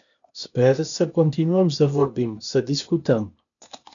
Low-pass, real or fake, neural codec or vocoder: 7.2 kHz; fake; codec, 16 kHz, 0.8 kbps, ZipCodec